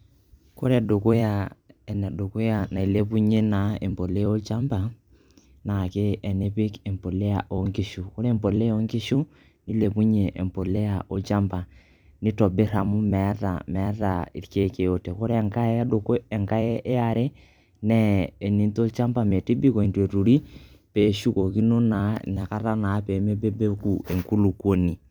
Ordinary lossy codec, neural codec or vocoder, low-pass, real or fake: none; vocoder, 44.1 kHz, 128 mel bands every 256 samples, BigVGAN v2; 19.8 kHz; fake